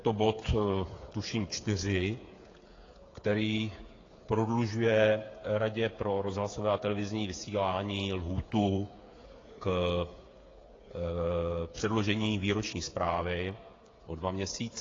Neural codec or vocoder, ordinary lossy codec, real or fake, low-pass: codec, 16 kHz, 8 kbps, FreqCodec, smaller model; AAC, 32 kbps; fake; 7.2 kHz